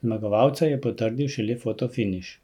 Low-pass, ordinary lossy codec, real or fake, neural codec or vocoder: 19.8 kHz; none; real; none